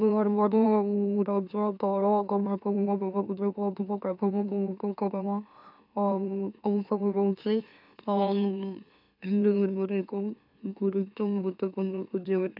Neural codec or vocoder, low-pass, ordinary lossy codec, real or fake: autoencoder, 44.1 kHz, a latent of 192 numbers a frame, MeloTTS; 5.4 kHz; none; fake